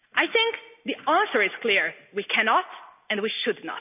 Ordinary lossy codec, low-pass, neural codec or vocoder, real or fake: none; 3.6 kHz; none; real